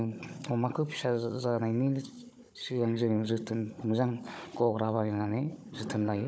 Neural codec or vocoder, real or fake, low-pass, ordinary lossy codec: codec, 16 kHz, 16 kbps, FunCodec, trained on Chinese and English, 50 frames a second; fake; none; none